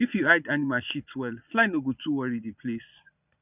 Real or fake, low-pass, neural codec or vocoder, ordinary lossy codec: fake; 3.6 kHz; vocoder, 22.05 kHz, 80 mel bands, Vocos; none